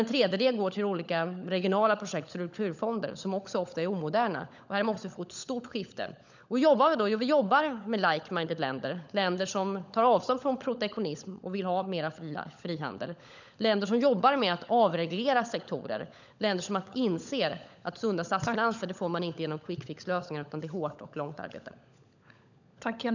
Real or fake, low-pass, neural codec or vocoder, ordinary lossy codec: fake; 7.2 kHz; codec, 16 kHz, 16 kbps, FunCodec, trained on LibriTTS, 50 frames a second; none